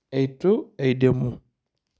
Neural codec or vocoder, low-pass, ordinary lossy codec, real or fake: none; none; none; real